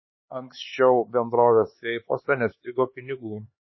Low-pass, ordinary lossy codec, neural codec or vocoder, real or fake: 7.2 kHz; MP3, 24 kbps; codec, 16 kHz, 4 kbps, X-Codec, HuBERT features, trained on LibriSpeech; fake